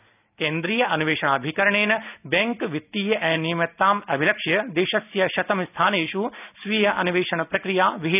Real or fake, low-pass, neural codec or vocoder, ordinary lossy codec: real; 3.6 kHz; none; none